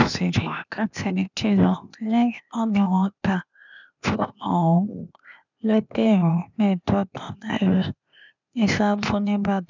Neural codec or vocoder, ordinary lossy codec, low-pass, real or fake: codec, 16 kHz, 0.8 kbps, ZipCodec; none; 7.2 kHz; fake